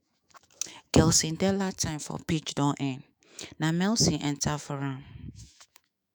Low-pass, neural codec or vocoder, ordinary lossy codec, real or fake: none; autoencoder, 48 kHz, 128 numbers a frame, DAC-VAE, trained on Japanese speech; none; fake